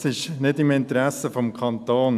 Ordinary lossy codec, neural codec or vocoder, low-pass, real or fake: none; none; 14.4 kHz; real